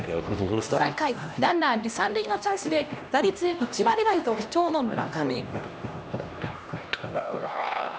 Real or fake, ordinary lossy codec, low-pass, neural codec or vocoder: fake; none; none; codec, 16 kHz, 1 kbps, X-Codec, HuBERT features, trained on LibriSpeech